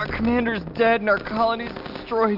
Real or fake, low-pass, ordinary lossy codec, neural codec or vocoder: real; 5.4 kHz; AAC, 48 kbps; none